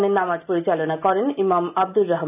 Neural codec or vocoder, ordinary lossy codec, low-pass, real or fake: none; none; 3.6 kHz; real